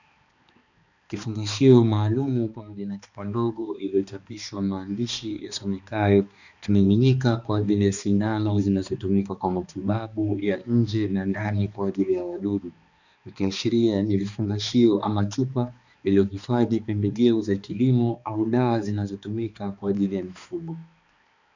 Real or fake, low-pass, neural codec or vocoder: fake; 7.2 kHz; codec, 16 kHz, 2 kbps, X-Codec, HuBERT features, trained on balanced general audio